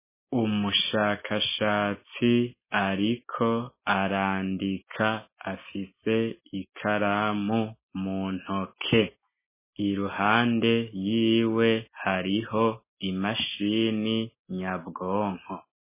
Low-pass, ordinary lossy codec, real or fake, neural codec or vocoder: 3.6 kHz; MP3, 16 kbps; real; none